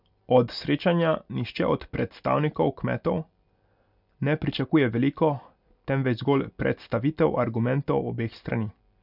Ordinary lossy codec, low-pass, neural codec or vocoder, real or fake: none; 5.4 kHz; none; real